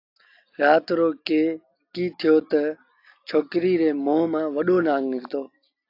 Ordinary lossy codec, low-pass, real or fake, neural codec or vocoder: AAC, 32 kbps; 5.4 kHz; real; none